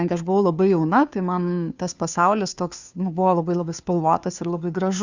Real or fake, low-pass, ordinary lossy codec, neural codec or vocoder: fake; 7.2 kHz; Opus, 64 kbps; codec, 16 kHz, 2 kbps, FunCodec, trained on Chinese and English, 25 frames a second